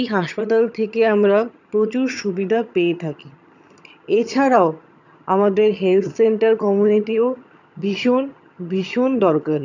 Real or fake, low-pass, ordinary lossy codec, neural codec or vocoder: fake; 7.2 kHz; none; vocoder, 22.05 kHz, 80 mel bands, HiFi-GAN